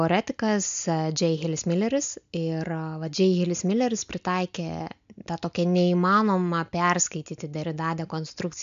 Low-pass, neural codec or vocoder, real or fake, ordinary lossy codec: 7.2 kHz; none; real; MP3, 64 kbps